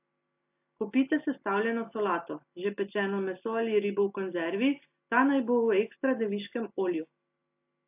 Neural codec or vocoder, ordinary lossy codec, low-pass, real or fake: none; AAC, 32 kbps; 3.6 kHz; real